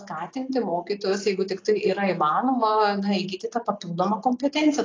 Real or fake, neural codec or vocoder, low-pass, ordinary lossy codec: real; none; 7.2 kHz; AAC, 32 kbps